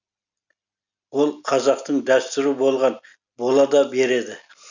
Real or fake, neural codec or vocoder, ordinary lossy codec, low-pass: real; none; none; 7.2 kHz